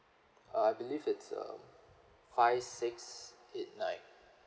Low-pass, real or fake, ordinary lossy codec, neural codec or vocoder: none; real; none; none